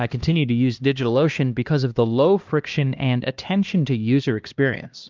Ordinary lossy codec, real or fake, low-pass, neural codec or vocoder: Opus, 24 kbps; fake; 7.2 kHz; codec, 16 kHz, 1 kbps, X-Codec, HuBERT features, trained on LibriSpeech